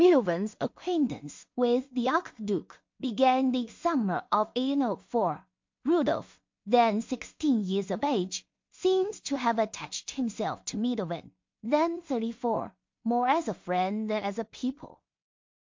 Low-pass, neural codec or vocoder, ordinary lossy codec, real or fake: 7.2 kHz; codec, 16 kHz in and 24 kHz out, 0.4 kbps, LongCat-Audio-Codec, two codebook decoder; MP3, 48 kbps; fake